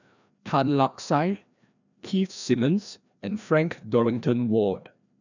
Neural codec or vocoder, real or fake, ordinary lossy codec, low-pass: codec, 16 kHz, 1 kbps, FreqCodec, larger model; fake; none; 7.2 kHz